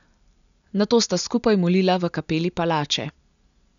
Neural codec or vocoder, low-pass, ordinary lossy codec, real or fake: none; 7.2 kHz; none; real